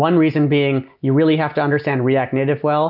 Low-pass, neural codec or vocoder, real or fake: 5.4 kHz; none; real